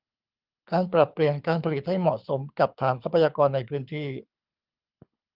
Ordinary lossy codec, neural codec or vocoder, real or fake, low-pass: Opus, 24 kbps; codec, 24 kHz, 6 kbps, HILCodec; fake; 5.4 kHz